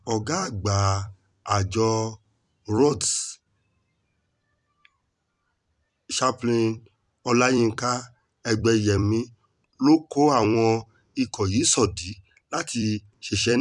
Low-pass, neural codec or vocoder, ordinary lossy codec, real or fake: 10.8 kHz; vocoder, 44.1 kHz, 128 mel bands every 256 samples, BigVGAN v2; none; fake